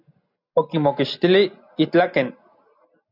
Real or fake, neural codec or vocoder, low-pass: real; none; 5.4 kHz